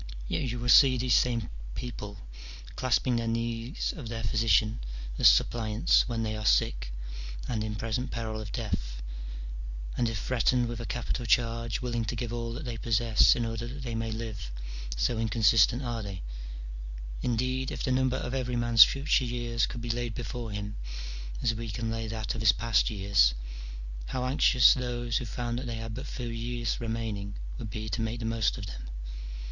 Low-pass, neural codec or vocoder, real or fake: 7.2 kHz; none; real